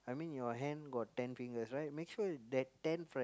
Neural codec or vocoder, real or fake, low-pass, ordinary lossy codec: none; real; none; none